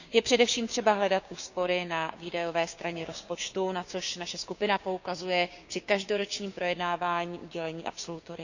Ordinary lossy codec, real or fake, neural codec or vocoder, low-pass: none; fake; codec, 16 kHz, 6 kbps, DAC; 7.2 kHz